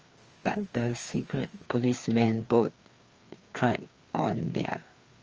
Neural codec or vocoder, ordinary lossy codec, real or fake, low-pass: codec, 16 kHz, 2 kbps, FreqCodec, larger model; Opus, 24 kbps; fake; 7.2 kHz